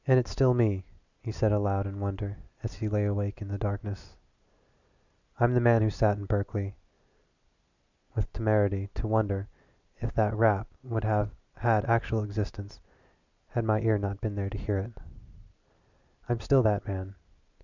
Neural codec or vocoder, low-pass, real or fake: none; 7.2 kHz; real